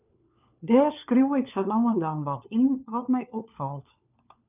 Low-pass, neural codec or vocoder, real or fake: 3.6 kHz; codec, 16 kHz, 4 kbps, FunCodec, trained on LibriTTS, 50 frames a second; fake